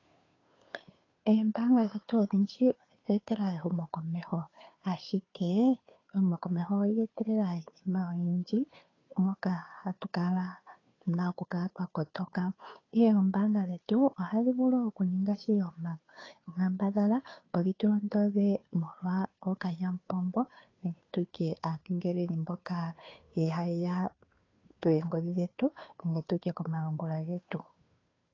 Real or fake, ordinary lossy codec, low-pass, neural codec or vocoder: fake; AAC, 32 kbps; 7.2 kHz; codec, 16 kHz, 2 kbps, FunCodec, trained on Chinese and English, 25 frames a second